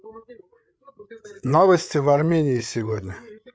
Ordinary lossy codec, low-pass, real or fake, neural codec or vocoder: none; none; fake; codec, 16 kHz, 8 kbps, FreqCodec, larger model